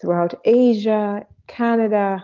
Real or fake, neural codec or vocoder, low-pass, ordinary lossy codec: real; none; 7.2 kHz; Opus, 32 kbps